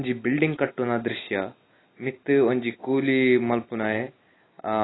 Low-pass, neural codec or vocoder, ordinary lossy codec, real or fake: 7.2 kHz; none; AAC, 16 kbps; real